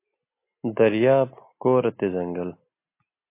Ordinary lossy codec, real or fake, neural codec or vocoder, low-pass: MP3, 24 kbps; real; none; 3.6 kHz